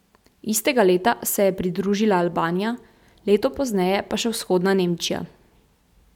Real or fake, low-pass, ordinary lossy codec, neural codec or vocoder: real; 19.8 kHz; none; none